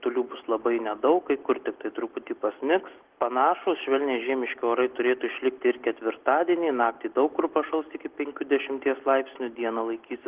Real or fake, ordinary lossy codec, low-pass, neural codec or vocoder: real; Opus, 16 kbps; 3.6 kHz; none